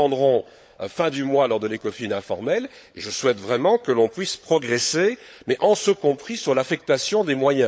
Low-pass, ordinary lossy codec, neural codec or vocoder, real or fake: none; none; codec, 16 kHz, 16 kbps, FunCodec, trained on LibriTTS, 50 frames a second; fake